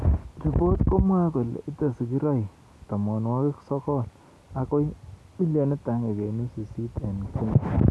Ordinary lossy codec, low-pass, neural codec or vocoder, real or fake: none; none; none; real